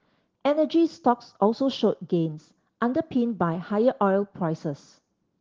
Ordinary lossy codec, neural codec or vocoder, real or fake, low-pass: Opus, 16 kbps; none; real; 7.2 kHz